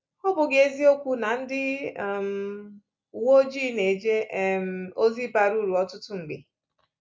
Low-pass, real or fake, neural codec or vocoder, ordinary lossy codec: none; real; none; none